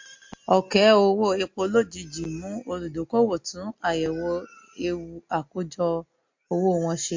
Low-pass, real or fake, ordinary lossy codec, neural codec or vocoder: 7.2 kHz; real; MP3, 48 kbps; none